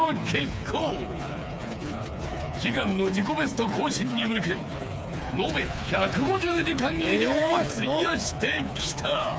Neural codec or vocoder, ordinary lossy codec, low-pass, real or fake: codec, 16 kHz, 4 kbps, FreqCodec, smaller model; none; none; fake